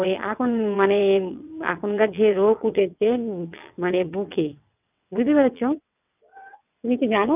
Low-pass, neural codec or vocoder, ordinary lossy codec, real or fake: 3.6 kHz; vocoder, 22.05 kHz, 80 mel bands, WaveNeXt; none; fake